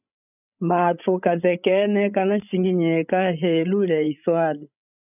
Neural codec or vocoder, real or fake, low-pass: codec, 16 kHz, 16 kbps, FreqCodec, larger model; fake; 3.6 kHz